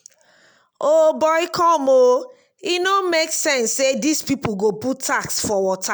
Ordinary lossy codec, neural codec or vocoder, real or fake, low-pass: none; none; real; none